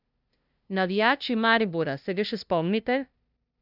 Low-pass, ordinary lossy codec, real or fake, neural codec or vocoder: 5.4 kHz; none; fake; codec, 16 kHz, 0.5 kbps, FunCodec, trained on LibriTTS, 25 frames a second